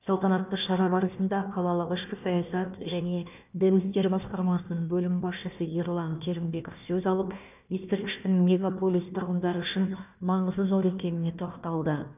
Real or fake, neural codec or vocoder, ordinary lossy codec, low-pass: fake; codec, 16 kHz, 1 kbps, FunCodec, trained on Chinese and English, 50 frames a second; none; 3.6 kHz